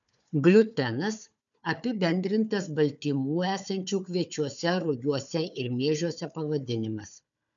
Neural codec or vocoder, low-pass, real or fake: codec, 16 kHz, 4 kbps, FunCodec, trained on Chinese and English, 50 frames a second; 7.2 kHz; fake